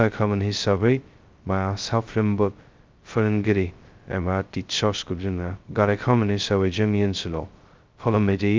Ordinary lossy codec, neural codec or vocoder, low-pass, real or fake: Opus, 32 kbps; codec, 16 kHz, 0.2 kbps, FocalCodec; 7.2 kHz; fake